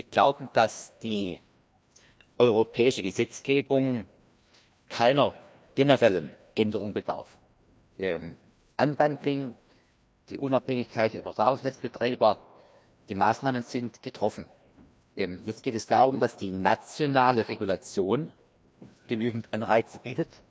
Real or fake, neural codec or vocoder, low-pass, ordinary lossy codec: fake; codec, 16 kHz, 1 kbps, FreqCodec, larger model; none; none